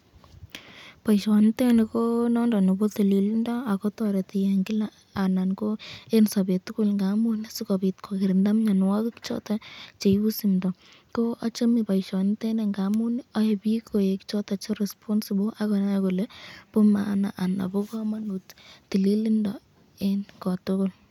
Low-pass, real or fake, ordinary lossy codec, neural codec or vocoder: 19.8 kHz; real; none; none